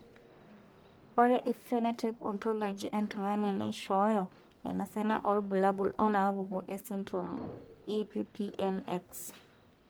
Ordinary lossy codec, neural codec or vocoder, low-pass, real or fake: none; codec, 44.1 kHz, 1.7 kbps, Pupu-Codec; none; fake